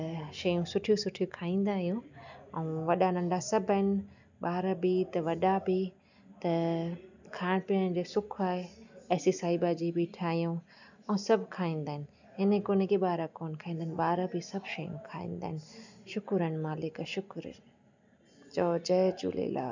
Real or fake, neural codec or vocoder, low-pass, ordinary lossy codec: real; none; 7.2 kHz; none